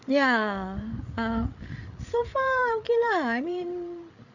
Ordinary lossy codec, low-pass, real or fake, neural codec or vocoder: none; 7.2 kHz; fake; codec, 16 kHz, 8 kbps, FreqCodec, larger model